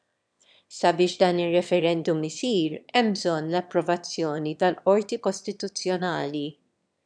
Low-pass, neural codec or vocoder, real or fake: 9.9 kHz; autoencoder, 22.05 kHz, a latent of 192 numbers a frame, VITS, trained on one speaker; fake